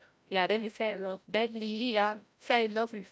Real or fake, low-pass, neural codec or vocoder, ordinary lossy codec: fake; none; codec, 16 kHz, 0.5 kbps, FreqCodec, larger model; none